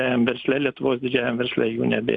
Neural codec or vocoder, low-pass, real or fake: none; 9.9 kHz; real